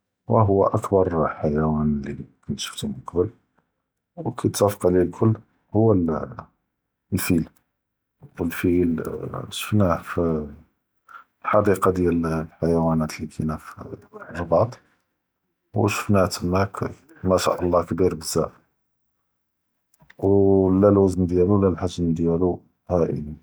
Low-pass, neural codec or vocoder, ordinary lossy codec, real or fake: none; none; none; real